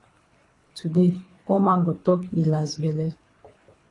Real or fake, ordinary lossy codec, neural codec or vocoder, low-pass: fake; AAC, 32 kbps; codec, 24 kHz, 3 kbps, HILCodec; 10.8 kHz